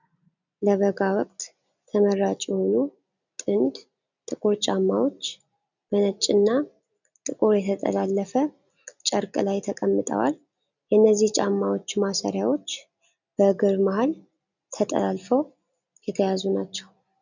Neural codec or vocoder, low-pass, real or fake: none; 7.2 kHz; real